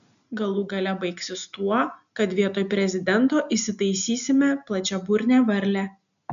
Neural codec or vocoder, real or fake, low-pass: none; real; 7.2 kHz